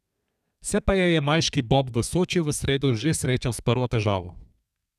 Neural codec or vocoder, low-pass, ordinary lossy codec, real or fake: codec, 32 kHz, 1.9 kbps, SNAC; 14.4 kHz; none; fake